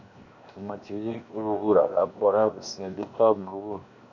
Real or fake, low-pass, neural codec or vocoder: fake; 7.2 kHz; codec, 16 kHz, 0.7 kbps, FocalCodec